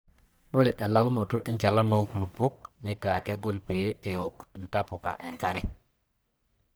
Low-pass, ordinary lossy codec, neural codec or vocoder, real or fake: none; none; codec, 44.1 kHz, 1.7 kbps, Pupu-Codec; fake